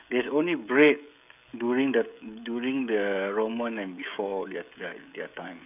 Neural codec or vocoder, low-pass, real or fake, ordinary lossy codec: codec, 16 kHz, 16 kbps, FreqCodec, smaller model; 3.6 kHz; fake; none